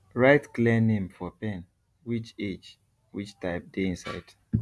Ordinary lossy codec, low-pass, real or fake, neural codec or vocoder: none; none; real; none